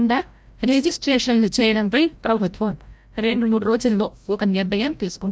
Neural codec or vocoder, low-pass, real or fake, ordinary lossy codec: codec, 16 kHz, 0.5 kbps, FreqCodec, larger model; none; fake; none